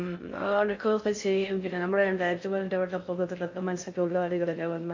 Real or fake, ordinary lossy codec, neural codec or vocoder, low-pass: fake; MP3, 48 kbps; codec, 16 kHz in and 24 kHz out, 0.6 kbps, FocalCodec, streaming, 4096 codes; 7.2 kHz